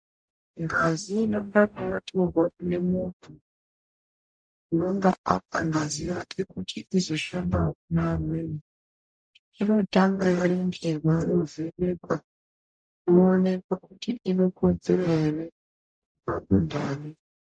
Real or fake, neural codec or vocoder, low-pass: fake; codec, 44.1 kHz, 0.9 kbps, DAC; 9.9 kHz